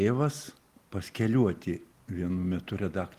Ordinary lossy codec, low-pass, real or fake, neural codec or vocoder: Opus, 24 kbps; 14.4 kHz; real; none